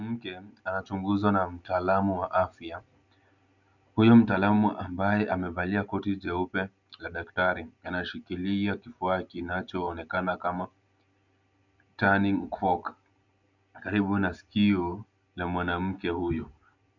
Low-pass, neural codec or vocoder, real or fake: 7.2 kHz; none; real